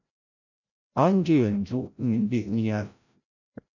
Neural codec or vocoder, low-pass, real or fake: codec, 16 kHz, 0.5 kbps, FreqCodec, larger model; 7.2 kHz; fake